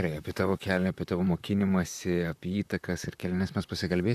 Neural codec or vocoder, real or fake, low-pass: vocoder, 44.1 kHz, 128 mel bands, Pupu-Vocoder; fake; 14.4 kHz